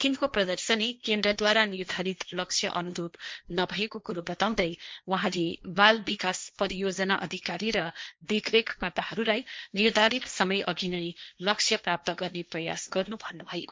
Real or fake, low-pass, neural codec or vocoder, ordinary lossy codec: fake; none; codec, 16 kHz, 1.1 kbps, Voila-Tokenizer; none